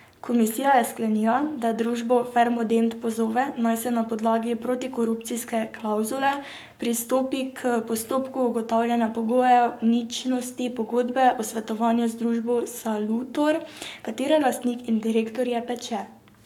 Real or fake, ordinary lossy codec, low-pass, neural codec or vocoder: fake; none; 19.8 kHz; codec, 44.1 kHz, 7.8 kbps, Pupu-Codec